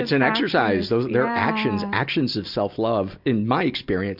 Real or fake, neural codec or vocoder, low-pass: real; none; 5.4 kHz